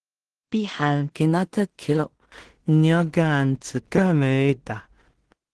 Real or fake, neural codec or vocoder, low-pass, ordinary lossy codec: fake; codec, 16 kHz in and 24 kHz out, 0.4 kbps, LongCat-Audio-Codec, two codebook decoder; 10.8 kHz; Opus, 16 kbps